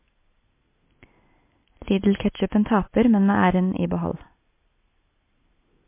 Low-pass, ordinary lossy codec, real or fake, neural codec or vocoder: 3.6 kHz; MP3, 24 kbps; real; none